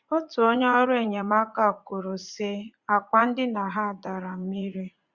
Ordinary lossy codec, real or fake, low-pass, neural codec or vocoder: Opus, 64 kbps; fake; 7.2 kHz; vocoder, 44.1 kHz, 80 mel bands, Vocos